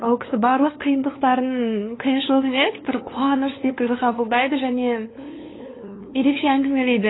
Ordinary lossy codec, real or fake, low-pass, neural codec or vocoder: AAC, 16 kbps; fake; 7.2 kHz; codec, 16 kHz, 2 kbps, FunCodec, trained on LibriTTS, 25 frames a second